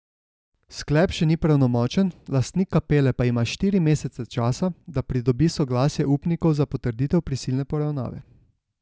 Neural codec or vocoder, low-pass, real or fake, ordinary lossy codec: none; none; real; none